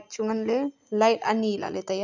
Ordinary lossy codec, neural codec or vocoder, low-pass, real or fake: none; none; 7.2 kHz; real